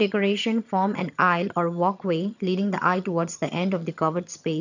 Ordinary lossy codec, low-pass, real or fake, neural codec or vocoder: none; 7.2 kHz; fake; vocoder, 22.05 kHz, 80 mel bands, HiFi-GAN